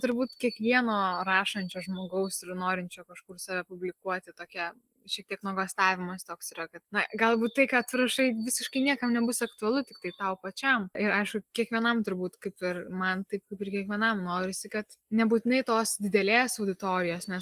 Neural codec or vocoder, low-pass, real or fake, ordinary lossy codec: none; 14.4 kHz; real; Opus, 24 kbps